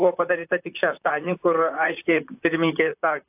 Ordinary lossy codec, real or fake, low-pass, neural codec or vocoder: AAC, 32 kbps; fake; 3.6 kHz; vocoder, 44.1 kHz, 128 mel bands, Pupu-Vocoder